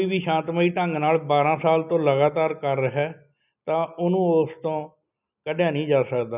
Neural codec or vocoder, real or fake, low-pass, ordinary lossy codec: none; real; 3.6 kHz; none